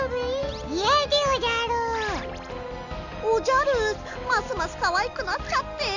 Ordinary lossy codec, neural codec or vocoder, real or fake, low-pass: none; none; real; 7.2 kHz